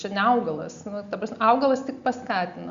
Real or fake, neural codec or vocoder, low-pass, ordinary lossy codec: real; none; 7.2 kHz; Opus, 64 kbps